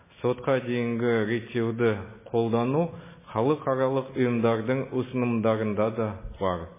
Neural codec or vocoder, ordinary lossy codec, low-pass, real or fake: none; MP3, 16 kbps; 3.6 kHz; real